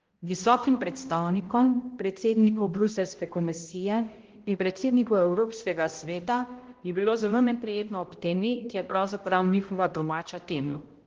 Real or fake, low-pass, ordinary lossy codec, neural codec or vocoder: fake; 7.2 kHz; Opus, 16 kbps; codec, 16 kHz, 0.5 kbps, X-Codec, HuBERT features, trained on balanced general audio